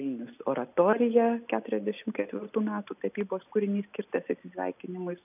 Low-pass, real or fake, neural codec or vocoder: 3.6 kHz; real; none